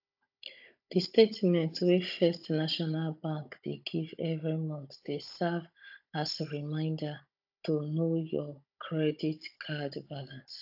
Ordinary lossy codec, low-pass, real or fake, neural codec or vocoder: AAC, 48 kbps; 5.4 kHz; fake; codec, 16 kHz, 16 kbps, FunCodec, trained on Chinese and English, 50 frames a second